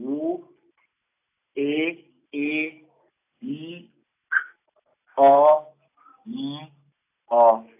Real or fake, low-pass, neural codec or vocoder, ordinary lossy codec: real; 3.6 kHz; none; AAC, 24 kbps